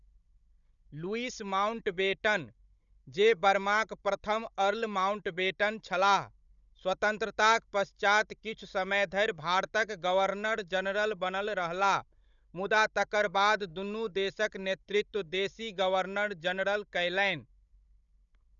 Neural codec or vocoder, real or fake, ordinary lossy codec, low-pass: codec, 16 kHz, 16 kbps, FunCodec, trained on Chinese and English, 50 frames a second; fake; none; 7.2 kHz